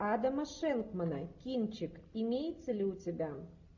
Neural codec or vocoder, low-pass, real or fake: none; 7.2 kHz; real